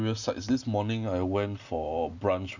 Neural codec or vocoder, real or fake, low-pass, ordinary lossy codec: none; real; 7.2 kHz; none